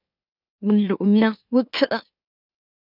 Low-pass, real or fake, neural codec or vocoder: 5.4 kHz; fake; autoencoder, 44.1 kHz, a latent of 192 numbers a frame, MeloTTS